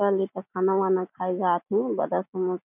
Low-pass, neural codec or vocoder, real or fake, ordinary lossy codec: 3.6 kHz; none; real; none